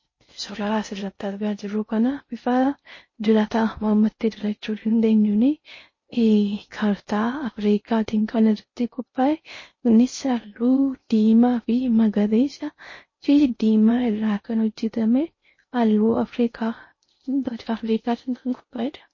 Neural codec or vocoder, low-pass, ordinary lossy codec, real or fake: codec, 16 kHz in and 24 kHz out, 0.6 kbps, FocalCodec, streaming, 4096 codes; 7.2 kHz; MP3, 32 kbps; fake